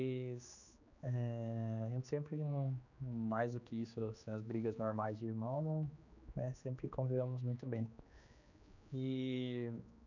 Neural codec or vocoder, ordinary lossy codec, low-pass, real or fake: codec, 16 kHz, 2 kbps, X-Codec, HuBERT features, trained on general audio; none; 7.2 kHz; fake